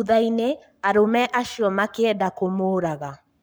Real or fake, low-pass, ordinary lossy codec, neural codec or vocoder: fake; none; none; codec, 44.1 kHz, 7.8 kbps, Pupu-Codec